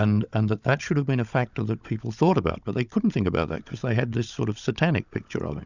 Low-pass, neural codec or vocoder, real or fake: 7.2 kHz; codec, 16 kHz, 16 kbps, FunCodec, trained on Chinese and English, 50 frames a second; fake